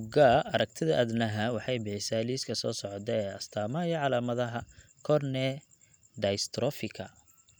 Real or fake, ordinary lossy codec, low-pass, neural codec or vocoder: real; none; none; none